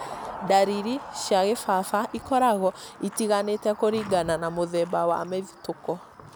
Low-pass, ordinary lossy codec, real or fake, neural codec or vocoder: none; none; real; none